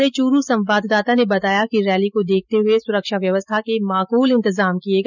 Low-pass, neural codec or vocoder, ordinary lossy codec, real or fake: 7.2 kHz; none; none; real